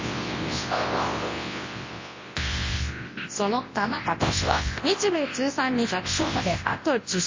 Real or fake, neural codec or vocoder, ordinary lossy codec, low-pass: fake; codec, 24 kHz, 0.9 kbps, WavTokenizer, large speech release; MP3, 32 kbps; 7.2 kHz